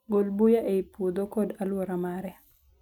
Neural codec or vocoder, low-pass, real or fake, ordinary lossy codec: none; 19.8 kHz; real; none